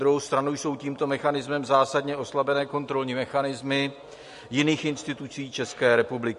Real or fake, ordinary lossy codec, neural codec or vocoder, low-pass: real; MP3, 48 kbps; none; 14.4 kHz